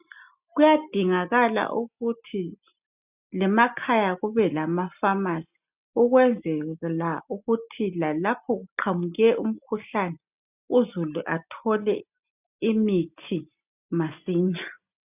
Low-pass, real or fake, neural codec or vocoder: 3.6 kHz; real; none